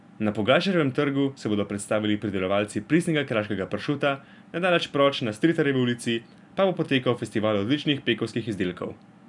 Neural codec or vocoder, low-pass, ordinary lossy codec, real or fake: none; 10.8 kHz; none; real